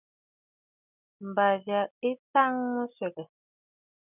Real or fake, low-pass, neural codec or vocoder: real; 3.6 kHz; none